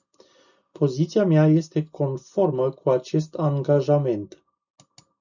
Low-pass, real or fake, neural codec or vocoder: 7.2 kHz; real; none